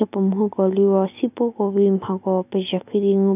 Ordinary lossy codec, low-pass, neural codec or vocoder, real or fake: none; 3.6 kHz; none; real